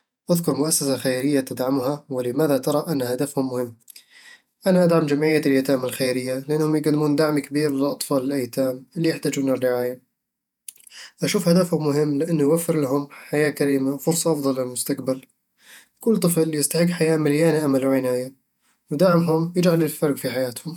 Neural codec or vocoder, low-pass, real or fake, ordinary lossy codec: vocoder, 48 kHz, 128 mel bands, Vocos; 19.8 kHz; fake; none